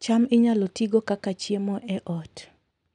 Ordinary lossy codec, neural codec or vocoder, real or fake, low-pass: none; none; real; 10.8 kHz